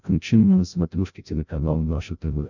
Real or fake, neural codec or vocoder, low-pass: fake; codec, 16 kHz, 0.5 kbps, FreqCodec, larger model; 7.2 kHz